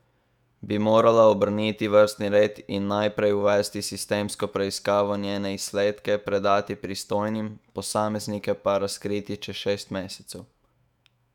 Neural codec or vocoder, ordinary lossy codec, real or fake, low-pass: autoencoder, 48 kHz, 128 numbers a frame, DAC-VAE, trained on Japanese speech; none; fake; 19.8 kHz